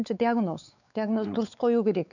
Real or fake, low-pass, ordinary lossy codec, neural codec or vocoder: fake; 7.2 kHz; none; codec, 16 kHz, 4 kbps, X-Codec, WavLM features, trained on Multilingual LibriSpeech